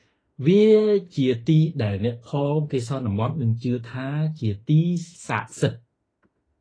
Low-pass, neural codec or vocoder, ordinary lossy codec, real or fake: 9.9 kHz; autoencoder, 48 kHz, 32 numbers a frame, DAC-VAE, trained on Japanese speech; AAC, 32 kbps; fake